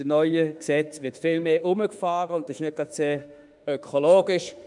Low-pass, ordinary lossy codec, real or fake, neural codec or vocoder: 10.8 kHz; none; fake; autoencoder, 48 kHz, 32 numbers a frame, DAC-VAE, trained on Japanese speech